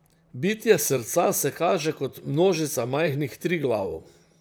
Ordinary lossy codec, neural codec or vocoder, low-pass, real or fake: none; vocoder, 44.1 kHz, 128 mel bands, Pupu-Vocoder; none; fake